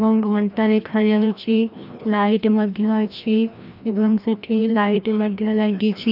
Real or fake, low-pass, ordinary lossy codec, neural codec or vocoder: fake; 5.4 kHz; none; codec, 16 kHz, 1 kbps, FreqCodec, larger model